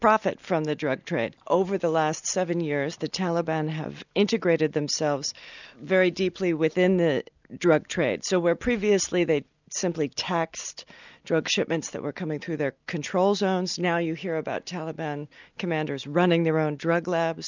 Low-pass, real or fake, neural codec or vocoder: 7.2 kHz; real; none